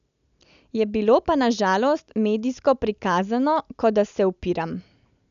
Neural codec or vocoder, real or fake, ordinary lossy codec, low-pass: none; real; none; 7.2 kHz